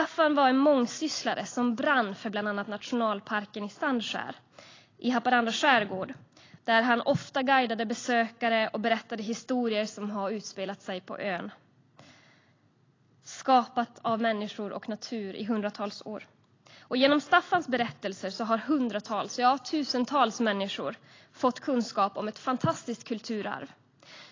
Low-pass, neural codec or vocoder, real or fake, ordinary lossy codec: 7.2 kHz; none; real; AAC, 32 kbps